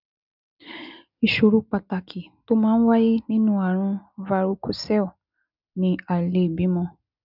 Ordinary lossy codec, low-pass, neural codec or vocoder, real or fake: none; 5.4 kHz; none; real